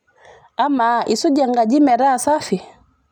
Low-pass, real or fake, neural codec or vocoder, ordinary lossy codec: 19.8 kHz; real; none; none